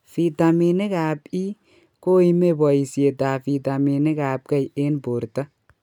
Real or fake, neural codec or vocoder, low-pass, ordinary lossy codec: real; none; 19.8 kHz; none